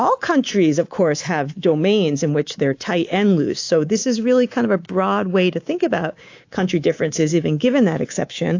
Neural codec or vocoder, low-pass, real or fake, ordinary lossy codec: codec, 24 kHz, 3.1 kbps, DualCodec; 7.2 kHz; fake; AAC, 48 kbps